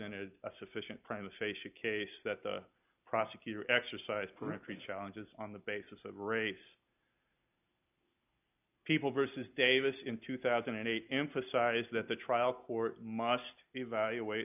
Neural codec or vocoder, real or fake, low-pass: none; real; 3.6 kHz